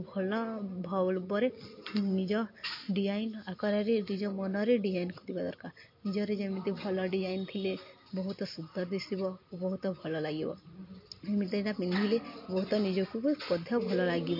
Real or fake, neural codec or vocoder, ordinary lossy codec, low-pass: real; none; MP3, 32 kbps; 5.4 kHz